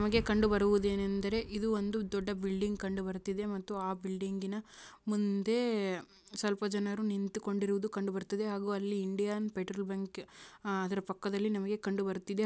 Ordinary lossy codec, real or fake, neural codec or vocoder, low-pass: none; real; none; none